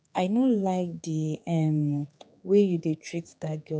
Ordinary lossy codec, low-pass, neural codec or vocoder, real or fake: none; none; codec, 16 kHz, 4 kbps, X-Codec, HuBERT features, trained on general audio; fake